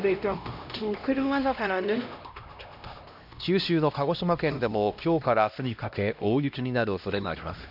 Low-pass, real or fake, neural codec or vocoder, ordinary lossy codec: 5.4 kHz; fake; codec, 16 kHz, 1 kbps, X-Codec, HuBERT features, trained on LibriSpeech; none